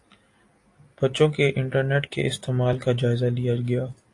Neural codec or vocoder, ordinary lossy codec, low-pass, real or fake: none; AAC, 48 kbps; 10.8 kHz; real